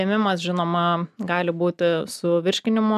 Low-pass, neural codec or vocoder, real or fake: 14.4 kHz; none; real